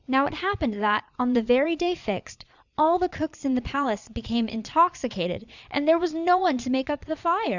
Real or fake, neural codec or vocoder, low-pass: fake; vocoder, 22.05 kHz, 80 mel bands, Vocos; 7.2 kHz